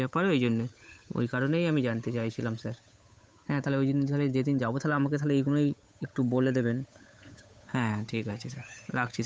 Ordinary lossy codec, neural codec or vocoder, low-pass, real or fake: none; codec, 16 kHz, 8 kbps, FunCodec, trained on Chinese and English, 25 frames a second; none; fake